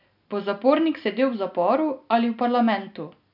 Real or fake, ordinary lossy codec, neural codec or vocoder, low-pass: real; none; none; 5.4 kHz